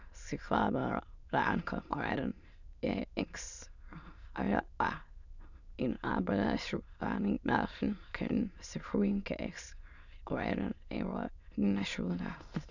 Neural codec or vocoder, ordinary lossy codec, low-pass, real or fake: autoencoder, 22.05 kHz, a latent of 192 numbers a frame, VITS, trained on many speakers; none; 7.2 kHz; fake